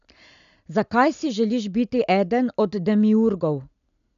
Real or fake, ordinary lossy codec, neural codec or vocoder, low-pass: real; none; none; 7.2 kHz